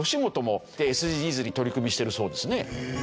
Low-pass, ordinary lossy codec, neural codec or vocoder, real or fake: none; none; none; real